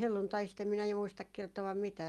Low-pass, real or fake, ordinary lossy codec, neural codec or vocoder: 19.8 kHz; real; Opus, 32 kbps; none